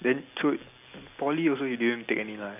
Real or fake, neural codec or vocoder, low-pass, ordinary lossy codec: real; none; 3.6 kHz; none